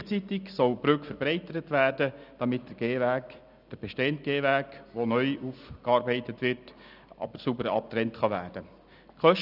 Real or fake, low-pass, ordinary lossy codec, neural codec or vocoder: real; 5.4 kHz; none; none